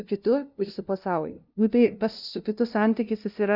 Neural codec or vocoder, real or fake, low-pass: codec, 16 kHz, 0.5 kbps, FunCodec, trained on LibriTTS, 25 frames a second; fake; 5.4 kHz